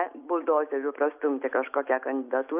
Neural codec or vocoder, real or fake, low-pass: none; real; 3.6 kHz